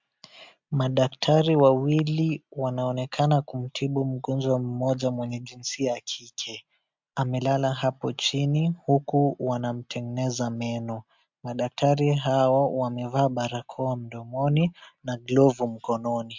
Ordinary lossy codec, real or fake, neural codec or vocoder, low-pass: MP3, 64 kbps; real; none; 7.2 kHz